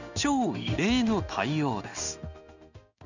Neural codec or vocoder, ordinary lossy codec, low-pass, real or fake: codec, 16 kHz in and 24 kHz out, 1 kbps, XY-Tokenizer; none; 7.2 kHz; fake